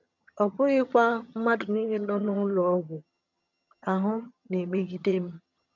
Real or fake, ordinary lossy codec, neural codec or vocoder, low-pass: fake; none; vocoder, 22.05 kHz, 80 mel bands, HiFi-GAN; 7.2 kHz